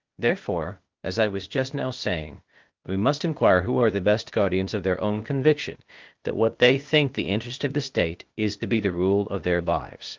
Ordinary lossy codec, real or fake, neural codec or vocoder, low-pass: Opus, 24 kbps; fake; codec, 16 kHz, 0.8 kbps, ZipCodec; 7.2 kHz